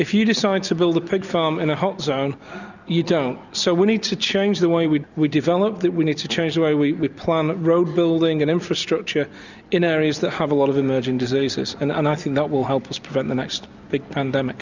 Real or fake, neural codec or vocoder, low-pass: real; none; 7.2 kHz